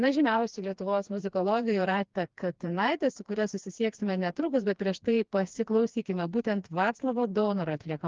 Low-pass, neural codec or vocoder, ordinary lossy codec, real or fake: 7.2 kHz; codec, 16 kHz, 2 kbps, FreqCodec, smaller model; Opus, 32 kbps; fake